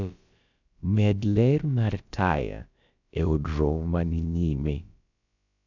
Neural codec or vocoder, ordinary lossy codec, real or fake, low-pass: codec, 16 kHz, about 1 kbps, DyCAST, with the encoder's durations; none; fake; 7.2 kHz